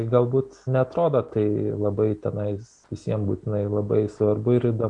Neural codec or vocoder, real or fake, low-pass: none; real; 9.9 kHz